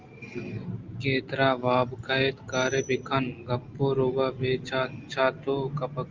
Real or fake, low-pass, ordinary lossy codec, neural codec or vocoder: real; 7.2 kHz; Opus, 32 kbps; none